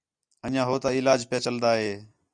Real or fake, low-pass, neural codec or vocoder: real; 9.9 kHz; none